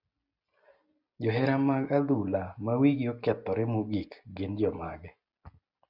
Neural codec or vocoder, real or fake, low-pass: none; real; 5.4 kHz